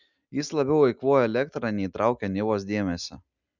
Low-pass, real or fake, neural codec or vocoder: 7.2 kHz; real; none